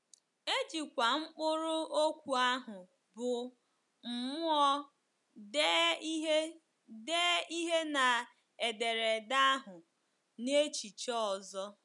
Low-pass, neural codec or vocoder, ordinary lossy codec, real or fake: 10.8 kHz; none; none; real